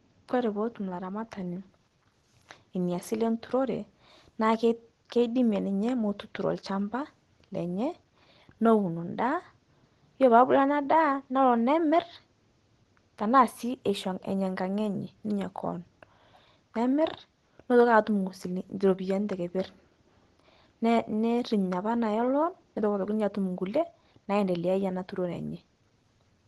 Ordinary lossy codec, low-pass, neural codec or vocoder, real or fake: Opus, 16 kbps; 10.8 kHz; none; real